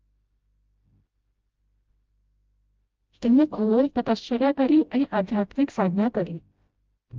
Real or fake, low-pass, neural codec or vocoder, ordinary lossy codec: fake; 7.2 kHz; codec, 16 kHz, 0.5 kbps, FreqCodec, smaller model; Opus, 24 kbps